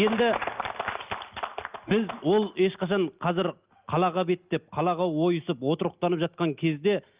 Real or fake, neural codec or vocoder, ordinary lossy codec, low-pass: real; none; Opus, 32 kbps; 3.6 kHz